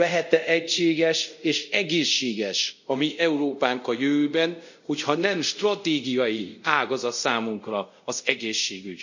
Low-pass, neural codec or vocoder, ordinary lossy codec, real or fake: 7.2 kHz; codec, 24 kHz, 0.5 kbps, DualCodec; none; fake